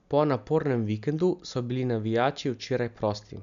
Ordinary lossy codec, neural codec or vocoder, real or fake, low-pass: AAC, 96 kbps; none; real; 7.2 kHz